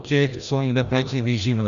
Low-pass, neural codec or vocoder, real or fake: 7.2 kHz; codec, 16 kHz, 1 kbps, FreqCodec, larger model; fake